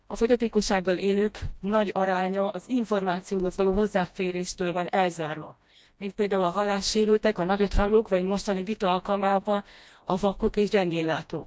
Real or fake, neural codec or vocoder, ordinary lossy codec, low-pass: fake; codec, 16 kHz, 1 kbps, FreqCodec, smaller model; none; none